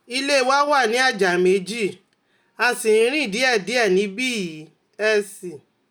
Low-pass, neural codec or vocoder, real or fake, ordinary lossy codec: none; none; real; none